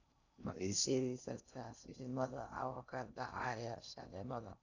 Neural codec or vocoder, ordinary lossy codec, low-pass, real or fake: codec, 16 kHz in and 24 kHz out, 0.6 kbps, FocalCodec, streaming, 4096 codes; none; 7.2 kHz; fake